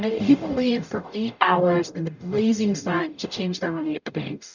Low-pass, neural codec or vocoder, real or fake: 7.2 kHz; codec, 44.1 kHz, 0.9 kbps, DAC; fake